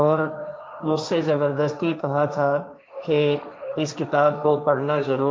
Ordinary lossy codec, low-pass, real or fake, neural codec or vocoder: none; none; fake; codec, 16 kHz, 1.1 kbps, Voila-Tokenizer